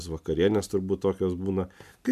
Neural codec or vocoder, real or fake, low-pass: none; real; 14.4 kHz